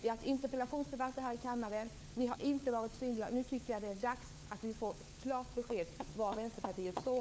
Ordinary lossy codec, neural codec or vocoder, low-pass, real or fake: none; codec, 16 kHz, 4 kbps, FunCodec, trained on LibriTTS, 50 frames a second; none; fake